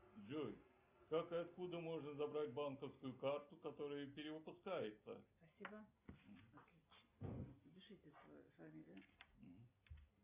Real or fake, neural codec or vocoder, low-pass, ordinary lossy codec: real; none; 3.6 kHz; Opus, 64 kbps